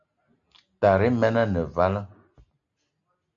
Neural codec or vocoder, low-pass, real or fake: none; 7.2 kHz; real